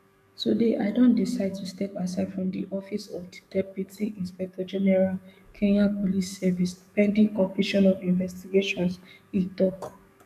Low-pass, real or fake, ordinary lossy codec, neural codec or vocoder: 14.4 kHz; fake; none; codec, 44.1 kHz, 7.8 kbps, DAC